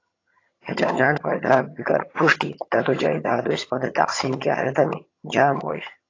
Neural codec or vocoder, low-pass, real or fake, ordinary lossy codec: vocoder, 22.05 kHz, 80 mel bands, HiFi-GAN; 7.2 kHz; fake; AAC, 32 kbps